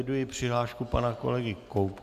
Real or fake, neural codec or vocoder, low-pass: real; none; 14.4 kHz